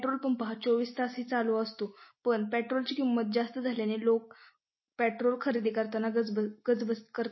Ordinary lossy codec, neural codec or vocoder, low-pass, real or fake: MP3, 24 kbps; none; 7.2 kHz; real